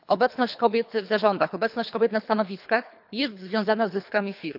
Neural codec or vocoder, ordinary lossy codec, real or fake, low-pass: codec, 24 kHz, 3 kbps, HILCodec; AAC, 48 kbps; fake; 5.4 kHz